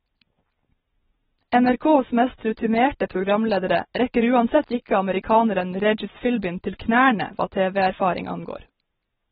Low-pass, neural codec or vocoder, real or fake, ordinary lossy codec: 10.8 kHz; none; real; AAC, 16 kbps